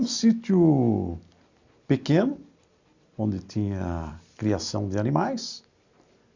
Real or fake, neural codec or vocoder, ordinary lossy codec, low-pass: real; none; Opus, 64 kbps; 7.2 kHz